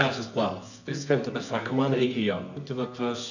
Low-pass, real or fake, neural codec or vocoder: 7.2 kHz; fake; codec, 24 kHz, 0.9 kbps, WavTokenizer, medium music audio release